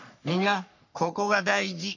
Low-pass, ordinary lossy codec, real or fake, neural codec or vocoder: 7.2 kHz; none; fake; codec, 44.1 kHz, 3.4 kbps, Pupu-Codec